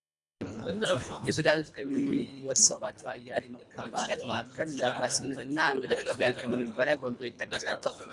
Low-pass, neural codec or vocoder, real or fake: 10.8 kHz; codec, 24 kHz, 1.5 kbps, HILCodec; fake